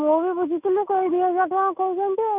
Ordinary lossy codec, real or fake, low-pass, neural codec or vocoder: none; real; 3.6 kHz; none